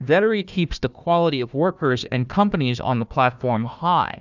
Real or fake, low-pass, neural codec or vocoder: fake; 7.2 kHz; codec, 16 kHz, 1 kbps, FunCodec, trained on Chinese and English, 50 frames a second